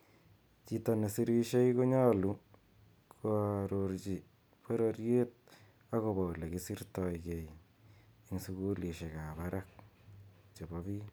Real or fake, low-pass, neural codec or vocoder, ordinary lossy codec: real; none; none; none